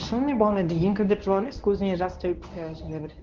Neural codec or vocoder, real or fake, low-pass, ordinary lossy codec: codec, 24 kHz, 0.9 kbps, WavTokenizer, medium speech release version 1; fake; 7.2 kHz; Opus, 32 kbps